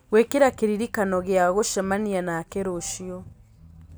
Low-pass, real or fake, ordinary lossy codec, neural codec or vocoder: none; real; none; none